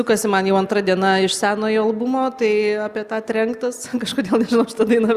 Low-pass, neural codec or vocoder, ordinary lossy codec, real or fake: 14.4 kHz; none; Opus, 64 kbps; real